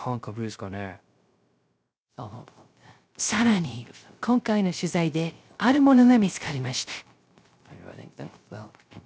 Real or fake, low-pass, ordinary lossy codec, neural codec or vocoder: fake; none; none; codec, 16 kHz, 0.3 kbps, FocalCodec